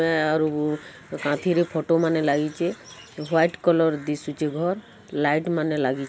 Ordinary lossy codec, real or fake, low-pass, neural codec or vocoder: none; real; none; none